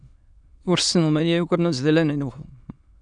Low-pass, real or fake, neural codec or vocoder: 9.9 kHz; fake; autoencoder, 22.05 kHz, a latent of 192 numbers a frame, VITS, trained on many speakers